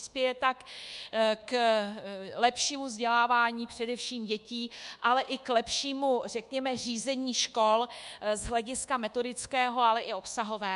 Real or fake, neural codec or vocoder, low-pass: fake; codec, 24 kHz, 1.2 kbps, DualCodec; 10.8 kHz